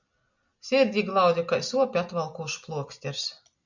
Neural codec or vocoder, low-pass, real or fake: none; 7.2 kHz; real